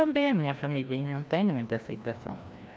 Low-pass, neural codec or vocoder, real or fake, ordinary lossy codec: none; codec, 16 kHz, 1 kbps, FreqCodec, larger model; fake; none